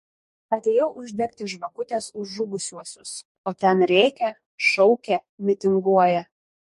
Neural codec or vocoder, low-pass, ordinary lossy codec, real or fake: codec, 44.1 kHz, 2.6 kbps, DAC; 14.4 kHz; MP3, 48 kbps; fake